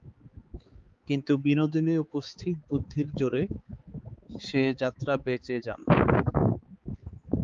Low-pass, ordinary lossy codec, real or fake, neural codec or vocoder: 7.2 kHz; Opus, 32 kbps; fake; codec, 16 kHz, 4 kbps, X-Codec, HuBERT features, trained on balanced general audio